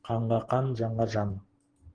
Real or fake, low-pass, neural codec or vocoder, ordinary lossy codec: fake; 9.9 kHz; vocoder, 44.1 kHz, 128 mel bands every 512 samples, BigVGAN v2; Opus, 16 kbps